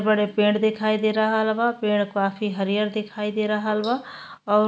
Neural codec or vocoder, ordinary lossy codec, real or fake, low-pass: none; none; real; none